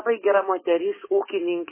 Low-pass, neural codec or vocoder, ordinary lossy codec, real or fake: 3.6 kHz; codec, 44.1 kHz, 7.8 kbps, DAC; MP3, 16 kbps; fake